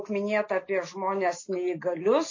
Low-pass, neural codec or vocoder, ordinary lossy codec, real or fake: 7.2 kHz; none; MP3, 32 kbps; real